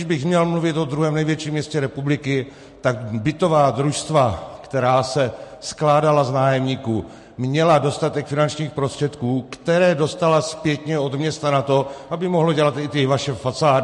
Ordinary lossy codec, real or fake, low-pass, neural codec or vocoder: MP3, 48 kbps; real; 14.4 kHz; none